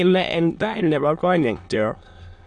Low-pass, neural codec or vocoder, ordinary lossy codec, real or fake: 9.9 kHz; autoencoder, 22.05 kHz, a latent of 192 numbers a frame, VITS, trained on many speakers; AAC, 64 kbps; fake